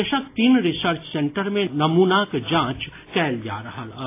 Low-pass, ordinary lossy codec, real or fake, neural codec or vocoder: 3.6 kHz; AAC, 24 kbps; real; none